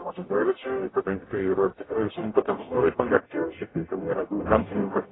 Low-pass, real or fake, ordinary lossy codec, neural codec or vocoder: 7.2 kHz; fake; AAC, 16 kbps; codec, 44.1 kHz, 0.9 kbps, DAC